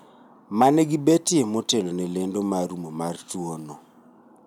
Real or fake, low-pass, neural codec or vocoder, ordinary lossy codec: real; 19.8 kHz; none; none